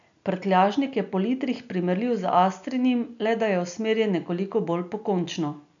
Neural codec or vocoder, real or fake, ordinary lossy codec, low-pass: none; real; none; 7.2 kHz